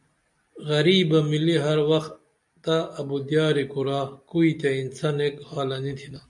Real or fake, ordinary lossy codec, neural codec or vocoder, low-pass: real; MP3, 64 kbps; none; 10.8 kHz